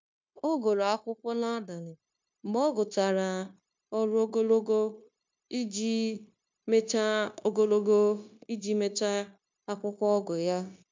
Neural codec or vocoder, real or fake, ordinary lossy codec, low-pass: codec, 16 kHz, 0.9 kbps, LongCat-Audio-Codec; fake; MP3, 64 kbps; 7.2 kHz